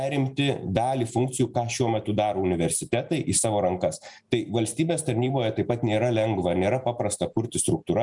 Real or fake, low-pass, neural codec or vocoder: real; 10.8 kHz; none